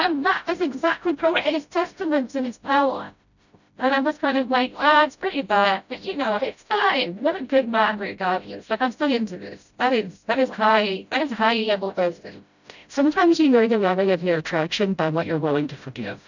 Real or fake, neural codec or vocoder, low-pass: fake; codec, 16 kHz, 0.5 kbps, FreqCodec, smaller model; 7.2 kHz